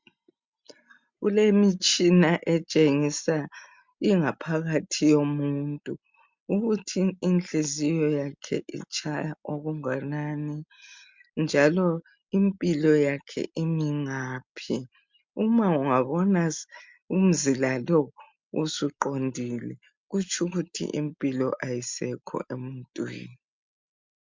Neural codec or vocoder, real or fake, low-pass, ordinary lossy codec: none; real; 7.2 kHz; MP3, 64 kbps